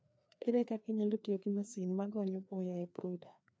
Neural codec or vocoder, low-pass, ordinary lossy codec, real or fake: codec, 16 kHz, 2 kbps, FreqCodec, larger model; none; none; fake